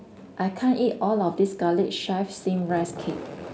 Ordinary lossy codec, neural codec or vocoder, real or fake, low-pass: none; none; real; none